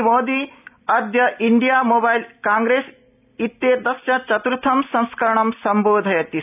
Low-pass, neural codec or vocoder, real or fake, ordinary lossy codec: 3.6 kHz; none; real; none